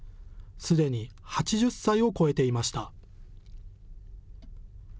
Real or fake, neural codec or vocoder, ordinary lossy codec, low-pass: real; none; none; none